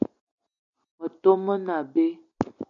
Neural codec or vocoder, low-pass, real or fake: none; 7.2 kHz; real